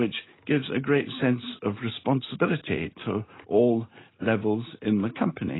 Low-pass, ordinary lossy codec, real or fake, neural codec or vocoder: 7.2 kHz; AAC, 16 kbps; real; none